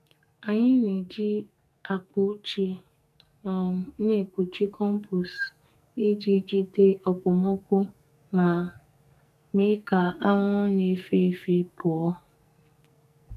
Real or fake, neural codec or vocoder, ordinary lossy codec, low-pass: fake; codec, 44.1 kHz, 2.6 kbps, SNAC; none; 14.4 kHz